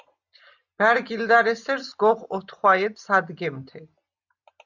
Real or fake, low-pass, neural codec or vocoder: real; 7.2 kHz; none